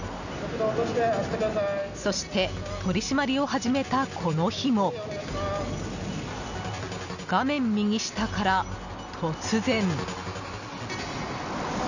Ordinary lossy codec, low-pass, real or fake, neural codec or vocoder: none; 7.2 kHz; real; none